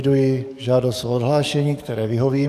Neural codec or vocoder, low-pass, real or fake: codec, 44.1 kHz, 7.8 kbps, DAC; 14.4 kHz; fake